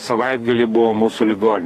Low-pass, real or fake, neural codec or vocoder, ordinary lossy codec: 14.4 kHz; fake; codec, 44.1 kHz, 2.6 kbps, SNAC; AAC, 48 kbps